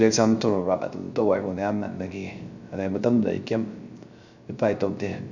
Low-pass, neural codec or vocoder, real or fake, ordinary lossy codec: 7.2 kHz; codec, 16 kHz, 0.3 kbps, FocalCodec; fake; none